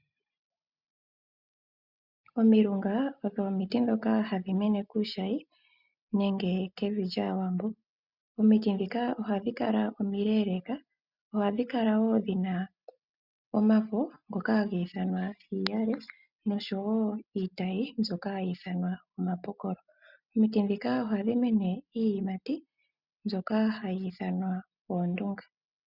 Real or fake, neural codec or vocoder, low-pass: real; none; 5.4 kHz